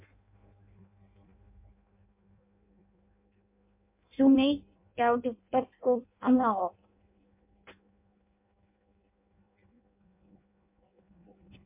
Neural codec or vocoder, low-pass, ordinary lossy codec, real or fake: codec, 16 kHz in and 24 kHz out, 0.6 kbps, FireRedTTS-2 codec; 3.6 kHz; MP3, 32 kbps; fake